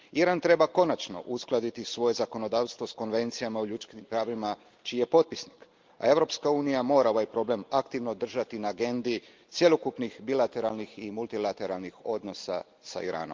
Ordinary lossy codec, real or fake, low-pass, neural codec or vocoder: Opus, 32 kbps; real; 7.2 kHz; none